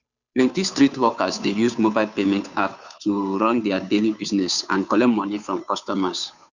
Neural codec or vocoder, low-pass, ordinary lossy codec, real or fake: codec, 16 kHz, 2 kbps, FunCodec, trained on Chinese and English, 25 frames a second; 7.2 kHz; none; fake